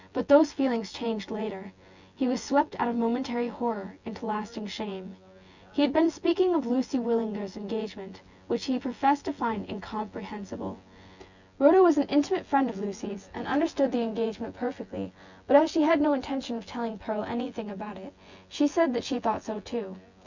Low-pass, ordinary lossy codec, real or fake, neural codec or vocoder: 7.2 kHz; Opus, 64 kbps; fake; vocoder, 24 kHz, 100 mel bands, Vocos